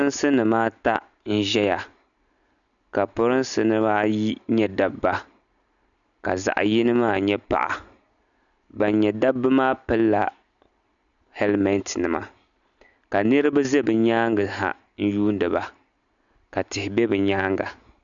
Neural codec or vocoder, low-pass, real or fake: none; 7.2 kHz; real